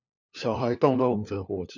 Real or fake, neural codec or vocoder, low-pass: fake; codec, 16 kHz, 4 kbps, FunCodec, trained on LibriTTS, 50 frames a second; 7.2 kHz